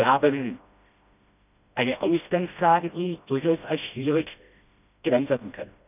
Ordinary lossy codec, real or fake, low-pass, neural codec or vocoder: none; fake; 3.6 kHz; codec, 16 kHz, 1 kbps, FreqCodec, smaller model